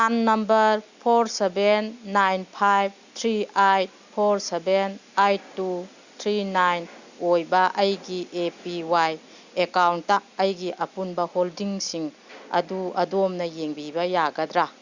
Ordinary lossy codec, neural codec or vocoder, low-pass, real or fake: Opus, 64 kbps; none; 7.2 kHz; real